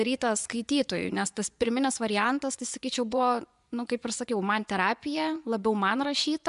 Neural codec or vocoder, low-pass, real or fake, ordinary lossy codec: vocoder, 24 kHz, 100 mel bands, Vocos; 10.8 kHz; fake; MP3, 96 kbps